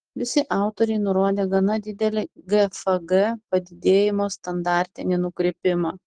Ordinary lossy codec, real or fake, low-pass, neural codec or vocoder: Opus, 16 kbps; real; 9.9 kHz; none